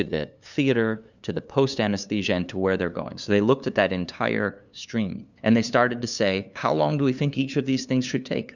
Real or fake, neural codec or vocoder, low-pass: fake; codec, 16 kHz, 2 kbps, FunCodec, trained on LibriTTS, 25 frames a second; 7.2 kHz